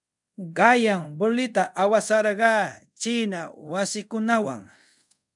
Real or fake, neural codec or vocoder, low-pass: fake; codec, 24 kHz, 0.5 kbps, DualCodec; 10.8 kHz